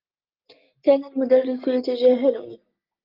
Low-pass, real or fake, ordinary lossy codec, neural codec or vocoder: 5.4 kHz; real; Opus, 24 kbps; none